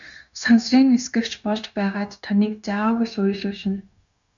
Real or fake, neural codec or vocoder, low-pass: fake; codec, 16 kHz, 0.9 kbps, LongCat-Audio-Codec; 7.2 kHz